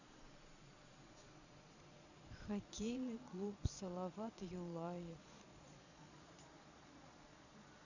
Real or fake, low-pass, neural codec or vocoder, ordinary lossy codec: fake; 7.2 kHz; vocoder, 22.05 kHz, 80 mel bands, Vocos; none